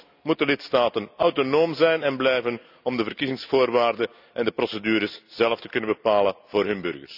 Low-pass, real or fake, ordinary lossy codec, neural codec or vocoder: 5.4 kHz; real; none; none